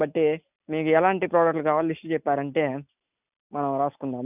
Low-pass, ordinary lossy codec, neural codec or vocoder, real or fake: 3.6 kHz; none; none; real